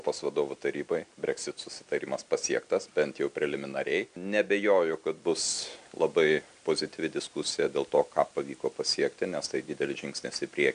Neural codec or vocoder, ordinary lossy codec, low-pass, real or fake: none; MP3, 96 kbps; 9.9 kHz; real